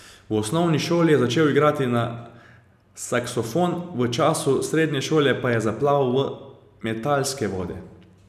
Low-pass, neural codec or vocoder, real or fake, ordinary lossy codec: 14.4 kHz; none; real; none